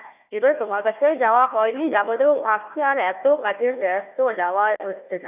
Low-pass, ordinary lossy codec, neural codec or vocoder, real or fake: 3.6 kHz; none; codec, 16 kHz, 1 kbps, FunCodec, trained on Chinese and English, 50 frames a second; fake